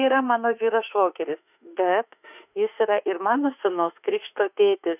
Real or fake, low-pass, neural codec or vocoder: fake; 3.6 kHz; autoencoder, 48 kHz, 32 numbers a frame, DAC-VAE, trained on Japanese speech